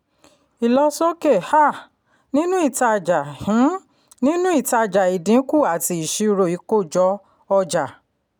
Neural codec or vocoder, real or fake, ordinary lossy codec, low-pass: none; real; none; none